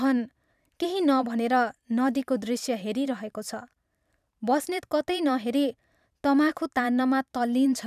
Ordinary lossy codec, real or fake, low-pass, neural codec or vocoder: none; fake; 14.4 kHz; vocoder, 44.1 kHz, 128 mel bands every 512 samples, BigVGAN v2